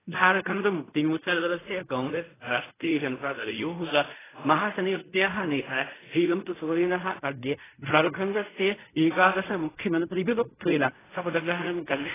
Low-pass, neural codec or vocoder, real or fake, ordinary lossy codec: 3.6 kHz; codec, 16 kHz in and 24 kHz out, 0.4 kbps, LongCat-Audio-Codec, fine tuned four codebook decoder; fake; AAC, 16 kbps